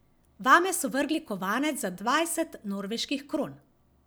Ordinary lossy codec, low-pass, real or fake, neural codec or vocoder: none; none; real; none